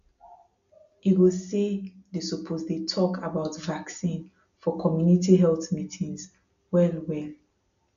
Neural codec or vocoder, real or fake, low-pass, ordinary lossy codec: none; real; 7.2 kHz; none